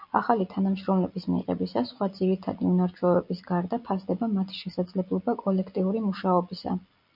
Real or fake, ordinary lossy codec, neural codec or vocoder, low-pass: real; MP3, 48 kbps; none; 5.4 kHz